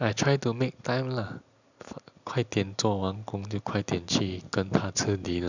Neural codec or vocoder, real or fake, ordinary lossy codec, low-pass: none; real; none; 7.2 kHz